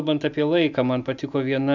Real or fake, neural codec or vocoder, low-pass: real; none; 7.2 kHz